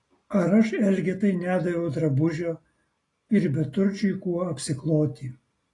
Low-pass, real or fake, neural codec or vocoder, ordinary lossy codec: 10.8 kHz; real; none; AAC, 32 kbps